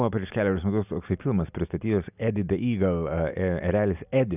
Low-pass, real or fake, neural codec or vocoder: 3.6 kHz; real; none